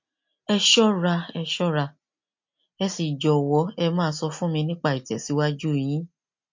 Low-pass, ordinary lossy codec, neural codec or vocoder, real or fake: 7.2 kHz; MP3, 48 kbps; none; real